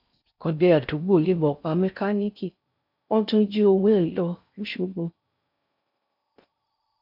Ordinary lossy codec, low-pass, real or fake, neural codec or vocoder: none; 5.4 kHz; fake; codec, 16 kHz in and 24 kHz out, 0.6 kbps, FocalCodec, streaming, 4096 codes